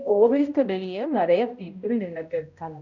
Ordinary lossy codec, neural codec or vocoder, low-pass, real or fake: none; codec, 16 kHz, 0.5 kbps, X-Codec, HuBERT features, trained on balanced general audio; 7.2 kHz; fake